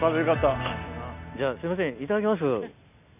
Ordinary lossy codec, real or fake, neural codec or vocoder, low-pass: none; real; none; 3.6 kHz